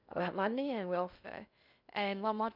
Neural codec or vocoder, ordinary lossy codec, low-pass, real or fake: codec, 16 kHz in and 24 kHz out, 0.6 kbps, FocalCodec, streaming, 2048 codes; none; 5.4 kHz; fake